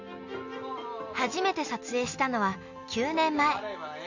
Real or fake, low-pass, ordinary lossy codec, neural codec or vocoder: real; 7.2 kHz; none; none